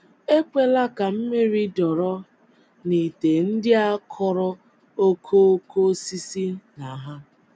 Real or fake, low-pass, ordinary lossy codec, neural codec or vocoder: real; none; none; none